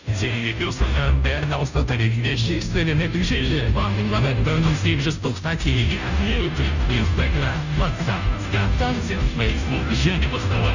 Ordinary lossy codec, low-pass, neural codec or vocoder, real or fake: none; 7.2 kHz; codec, 16 kHz, 0.5 kbps, FunCodec, trained on Chinese and English, 25 frames a second; fake